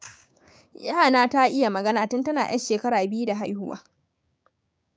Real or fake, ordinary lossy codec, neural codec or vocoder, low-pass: fake; none; codec, 16 kHz, 6 kbps, DAC; none